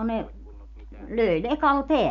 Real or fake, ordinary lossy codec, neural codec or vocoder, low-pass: real; none; none; 7.2 kHz